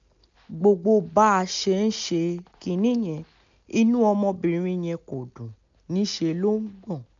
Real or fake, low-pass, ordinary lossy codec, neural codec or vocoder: real; 7.2 kHz; MP3, 64 kbps; none